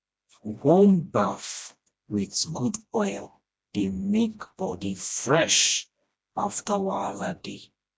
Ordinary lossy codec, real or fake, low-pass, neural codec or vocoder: none; fake; none; codec, 16 kHz, 1 kbps, FreqCodec, smaller model